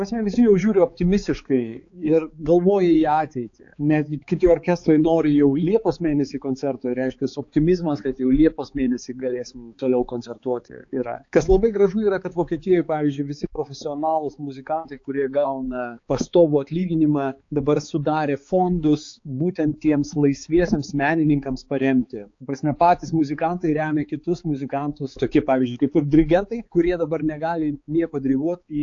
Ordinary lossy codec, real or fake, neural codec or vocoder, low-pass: AAC, 48 kbps; fake; codec, 16 kHz, 4 kbps, X-Codec, HuBERT features, trained on balanced general audio; 7.2 kHz